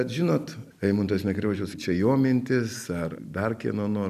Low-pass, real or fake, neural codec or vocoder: 14.4 kHz; real; none